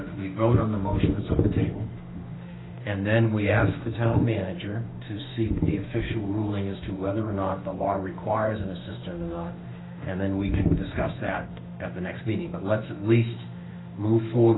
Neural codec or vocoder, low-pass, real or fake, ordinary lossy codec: autoencoder, 48 kHz, 32 numbers a frame, DAC-VAE, trained on Japanese speech; 7.2 kHz; fake; AAC, 16 kbps